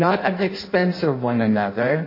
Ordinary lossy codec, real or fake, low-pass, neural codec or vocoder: MP3, 24 kbps; fake; 5.4 kHz; codec, 16 kHz in and 24 kHz out, 0.6 kbps, FireRedTTS-2 codec